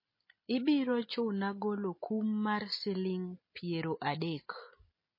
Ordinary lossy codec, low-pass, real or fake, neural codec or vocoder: MP3, 24 kbps; 5.4 kHz; real; none